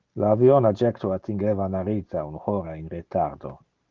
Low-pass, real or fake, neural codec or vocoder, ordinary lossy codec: 7.2 kHz; real; none; Opus, 16 kbps